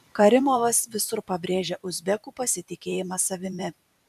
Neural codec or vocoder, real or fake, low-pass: vocoder, 44.1 kHz, 128 mel bands every 512 samples, BigVGAN v2; fake; 14.4 kHz